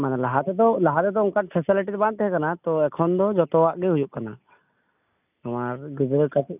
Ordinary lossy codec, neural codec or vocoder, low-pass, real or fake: none; none; 3.6 kHz; real